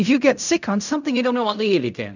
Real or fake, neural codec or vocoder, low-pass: fake; codec, 16 kHz in and 24 kHz out, 0.4 kbps, LongCat-Audio-Codec, fine tuned four codebook decoder; 7.2 kHz